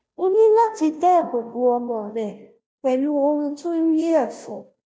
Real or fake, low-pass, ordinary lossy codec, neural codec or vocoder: fake; none; none; codec, 16 kHz, 0.5 kbps, FunCodec, trained on Chinese and English, 25 frames a second